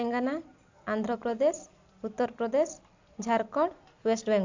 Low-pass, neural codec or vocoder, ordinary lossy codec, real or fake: 7.2 kHz; none; AAC, 48 kbps; real